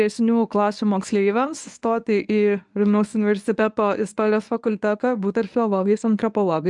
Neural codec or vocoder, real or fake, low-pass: codec, 24 kHz, 0.9 kbps, WavTokenizer, medium speech release version 1; fake; 10.8 kHz